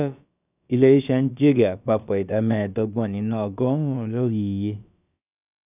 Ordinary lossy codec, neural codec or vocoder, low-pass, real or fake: none; codec, 16 kHz, about 1 kbps, DyCAST, with the encoder's durations; 3.6 kHz; fake